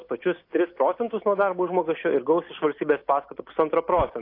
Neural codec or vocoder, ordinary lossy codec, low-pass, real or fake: none; AAC, 32 kbps; 5.4 kHz; real